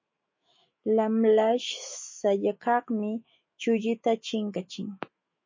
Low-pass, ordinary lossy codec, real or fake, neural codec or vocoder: 7.2 kHz; MP3, 32 kbps; fake; autoencoder, 48 kHz, 128 numbers a frame, DAC-VAE, trained on Japanese speech